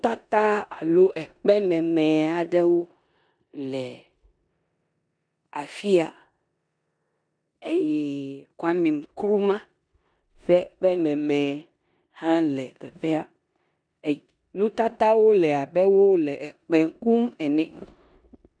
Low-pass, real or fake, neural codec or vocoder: 9.9 kHz; fake; codec, 16 kHz in and 24 kHz out, 0.9 kbps, LongCat-Audio-Codec, four codebook decoder